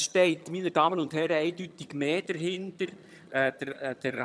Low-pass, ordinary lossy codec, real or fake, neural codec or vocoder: none; none; fake; vocoder, 22.05 kHz, 80 mel bands, HiFi-GAN